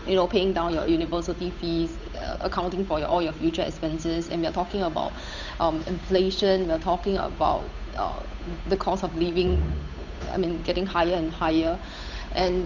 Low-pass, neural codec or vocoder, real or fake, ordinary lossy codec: 7.2 kHz; codec, 16 kHz, 8 kbps, FunCodec, trained on Chinese and English, 25 frames a second; fake; none